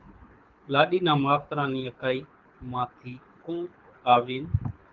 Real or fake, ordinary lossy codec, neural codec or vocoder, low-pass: fake; Opus, 32 kbps; codec, 24 kHz, 6 kbps, HILCodec; 7.2 kHz